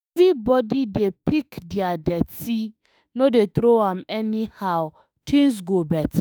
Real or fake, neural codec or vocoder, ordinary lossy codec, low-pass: fake; autoencoder, 48 kHz, 32 numbers a frame, DAC-VAE, trained on Japanese speech; none; none